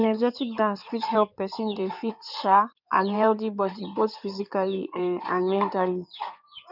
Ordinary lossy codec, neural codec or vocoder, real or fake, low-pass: none; codec, 16 kHz in and 24 kHz out, 2.2 kbps, FireRedTTS-2 codec; fake; 5.4 kHz